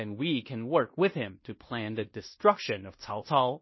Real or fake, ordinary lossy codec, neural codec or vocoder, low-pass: fake; MP3, 24 kbps; codec, 16 kHz in and 24 kHz out, 0.9 kbps, LongCat-Audio-Codec, fine tuned four codebook decoder; 7.2 kHz